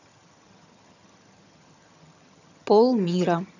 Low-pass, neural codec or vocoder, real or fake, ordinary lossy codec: 7.2 kHz; vocoder, 22.05 kHz, 80 mel bands, HiFi-GAN; fake; none